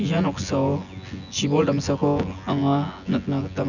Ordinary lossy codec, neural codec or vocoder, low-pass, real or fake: none; vocoder, 24 kHz, 100 mel bands, Vocos; 7.2 kHz; fake